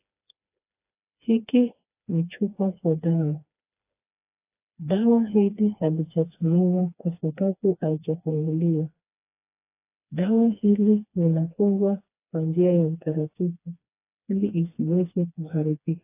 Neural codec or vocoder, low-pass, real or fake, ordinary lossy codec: codec, 16 kHz, 2 kbps, FreqCodec, smaller model; 3.6 kHz; fake; AAC, 24 kbps